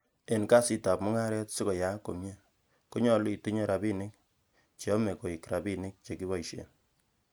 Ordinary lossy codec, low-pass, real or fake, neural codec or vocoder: none; none; real; none